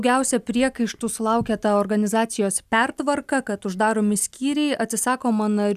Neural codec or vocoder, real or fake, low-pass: none; real; 14.4 kHz